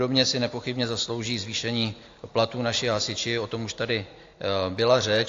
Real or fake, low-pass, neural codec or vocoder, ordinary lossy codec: real; 7.2 kHz; none; AAC, 48 kbps